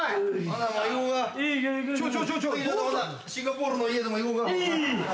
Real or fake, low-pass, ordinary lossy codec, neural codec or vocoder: real; none; none; none